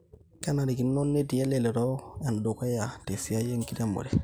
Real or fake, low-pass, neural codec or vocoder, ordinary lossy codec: real; none; none; none